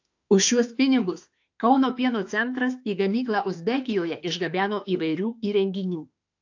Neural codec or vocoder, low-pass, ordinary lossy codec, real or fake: autoencoder, 48 kHz, 32 numbers a frame, DAC-VAE, trained on Japanese speech; 7.2 kHz; AAC, 48 kbps; fake